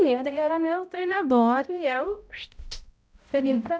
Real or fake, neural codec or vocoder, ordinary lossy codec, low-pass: fake; codec, 16 kHz, 0.5 kbps, X-Codec, HuBERT features, trained on balanced general audio; none; none